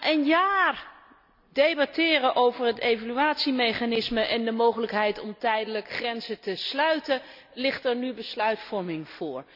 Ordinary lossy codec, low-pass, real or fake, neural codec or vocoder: none; 5.4 kHz; real; none